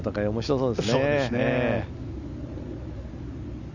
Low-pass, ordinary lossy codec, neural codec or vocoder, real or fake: 7.2 kHz; none; none; real